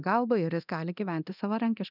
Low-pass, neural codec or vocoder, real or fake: 5.4 kHz; codec, 24 kHz, 0.9 kbps, DualCodec; fake